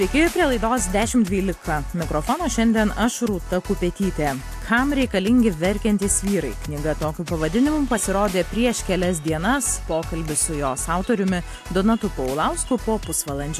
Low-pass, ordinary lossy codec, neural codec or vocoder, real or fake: 14.4 kHz; AAC, 64 kbps; none; real